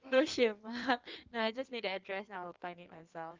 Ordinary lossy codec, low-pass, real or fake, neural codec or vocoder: Opus, 24 kbps; 7.2 kHz; fake; codec, 16 kHz in and 24 kHz out, 1.1 kbps, FireRedTTS-2 codec